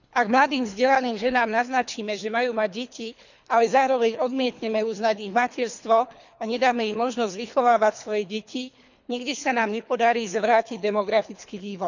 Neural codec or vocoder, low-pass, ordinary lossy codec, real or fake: codec, 24 kHz, 3 kbps, HILCodec; 7.2 kHz; none; fake